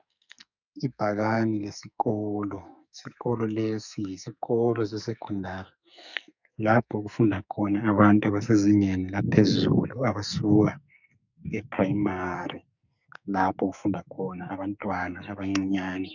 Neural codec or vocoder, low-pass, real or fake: codec, 44.1 kHz, 2.6 kbps, SNAC; 7.2 kHz; fake